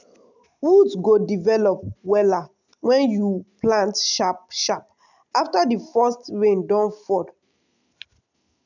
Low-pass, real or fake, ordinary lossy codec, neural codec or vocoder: 7.2 kHz; real; none; none